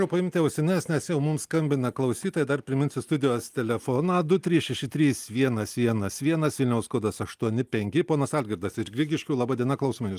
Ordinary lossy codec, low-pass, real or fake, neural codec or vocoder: Opus, 32 kbps; 14.4 kHz; real; none